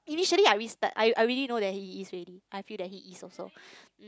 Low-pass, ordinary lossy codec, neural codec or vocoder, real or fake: none; none; none; real